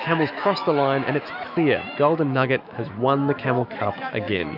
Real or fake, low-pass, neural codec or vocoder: fake; 5.4 kHz; autoencoder, 48 kHz, 128 numbers a frame, DAC-VAE, trained on Japanese speech